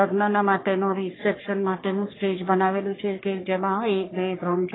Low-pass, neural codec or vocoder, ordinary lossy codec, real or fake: 7.2 kHz; codec, 44.1 kHz, 3.4 kbps, Pupu-Codec; AAC, 16 kbps; fake